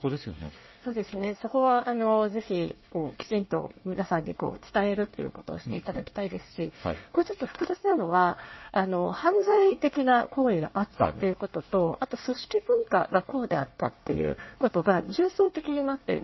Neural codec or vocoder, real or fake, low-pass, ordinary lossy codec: codec, 24 kHz, 1 kbps, SNAC; fake; 7.2 kHz; MP3, 24 kbps